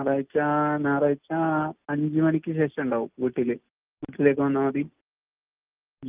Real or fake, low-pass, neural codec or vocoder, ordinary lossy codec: real; 3.6 kHz; none; Opus, 24 kbps